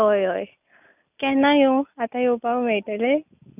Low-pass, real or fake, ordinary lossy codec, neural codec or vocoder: 3.6 kHz; real; none; none